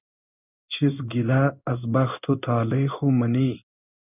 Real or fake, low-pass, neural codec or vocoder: fake; 3.6 kHz; codec, 16 kHz in and 24 kHz out, 1 kbps, XY-Tokenizer